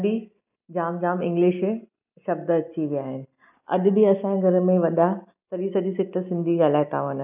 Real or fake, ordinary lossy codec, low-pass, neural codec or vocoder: real; none; 3.6 kHz; none